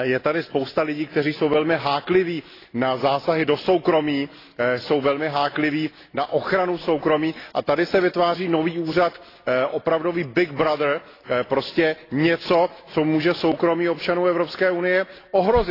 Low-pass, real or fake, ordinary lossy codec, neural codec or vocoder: 5.4 kHz; real; AAC, 24 kbps; none